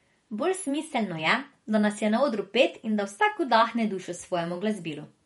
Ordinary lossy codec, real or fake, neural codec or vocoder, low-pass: MP3, 48 kbps; fake; vocoder, 48 kHz, 128 mel bands, Vocos; 19.8 kHz